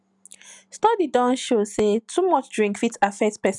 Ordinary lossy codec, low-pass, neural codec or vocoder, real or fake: none; 10.8 kHz; none; real